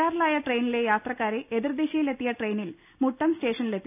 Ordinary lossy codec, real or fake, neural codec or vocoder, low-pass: none; real; none; 3.6 kHz